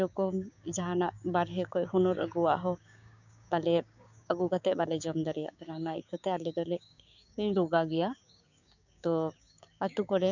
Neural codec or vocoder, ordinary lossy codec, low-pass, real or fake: codec, 16 kHz, 6 kbps, DAC; none; 7.2 kHz; fake